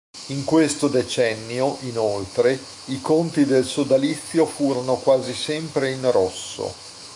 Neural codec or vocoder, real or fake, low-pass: autoencoder, 48 kHz, 128 numbers a frame, DAC-VAE, trained on Japanese speech; fake; 10.8 kHz